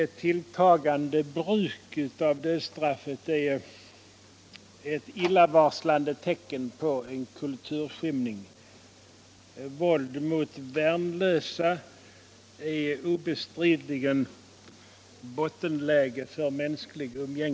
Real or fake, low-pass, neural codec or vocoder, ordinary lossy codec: real; none; none; none